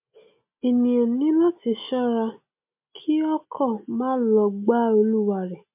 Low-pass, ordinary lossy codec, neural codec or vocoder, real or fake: 3.6 kHz; MP3, 32 kbps; none; real